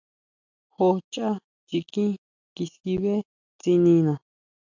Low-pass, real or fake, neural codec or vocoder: 7.2 kHz; real; none